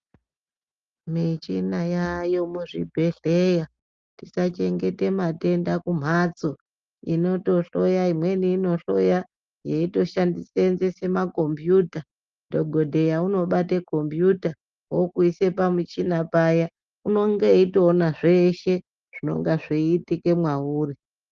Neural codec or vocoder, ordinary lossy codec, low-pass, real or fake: none; Opus, 32 kbps; 7.2 kHz; real